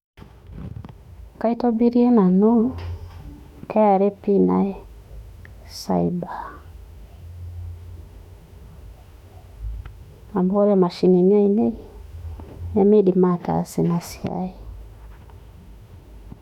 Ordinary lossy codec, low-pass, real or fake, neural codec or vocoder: none; 19.8 kHz; fake; autoencoder, 48 kHz, 32 numbers a frame, DAC-VAE, trained on Japanese speech